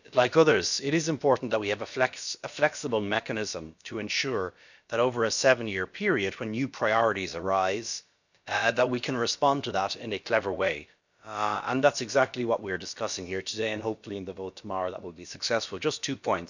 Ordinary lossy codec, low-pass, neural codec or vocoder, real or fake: none; 7.2 kHz; codec, 16 kHz, about 1 kbps, DyCAST, with the encoder's durations; fake